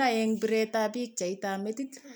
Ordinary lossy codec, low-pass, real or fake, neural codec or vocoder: none; none; real; none